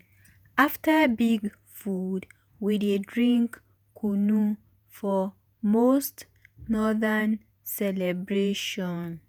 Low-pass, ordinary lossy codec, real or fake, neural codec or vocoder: none; none; fake; vocoder, 48 kHz, 128 mel bands, Vocos